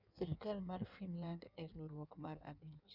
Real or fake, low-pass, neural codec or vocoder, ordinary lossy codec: fake; 5.4 kHz; codec, 16 kHz in and 24 kHz out, 1.1 kbps, FireRedTTS-2 codec; none